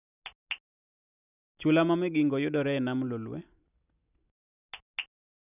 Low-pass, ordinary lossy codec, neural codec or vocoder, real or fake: 3.6 kHz; none; none; real